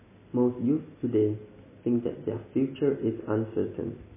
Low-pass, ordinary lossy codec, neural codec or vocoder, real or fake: 3.6 kHz; MP3, 16 kbps; none; real